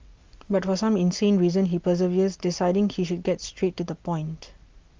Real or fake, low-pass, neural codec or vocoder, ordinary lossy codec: real; 7.2 kHz; none; Opus, 32 kbps